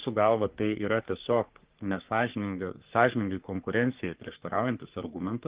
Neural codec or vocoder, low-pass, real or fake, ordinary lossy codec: codec, 44.1 kHz, 3.4 kbps, Pupu-Codec; 3.6 kHz; fake; Opus, 16 kbps